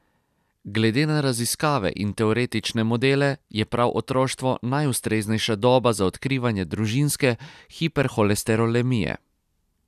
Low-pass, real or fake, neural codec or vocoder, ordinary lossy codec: 14.4 kHz; real; none; none